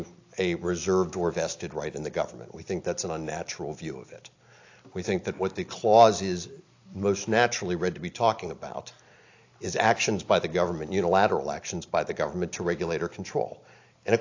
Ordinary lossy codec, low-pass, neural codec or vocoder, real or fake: AAC, 48 kbps; 7.2 kHz; none; real